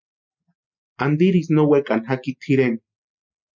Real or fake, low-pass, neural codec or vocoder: real; 7.2 kHz; none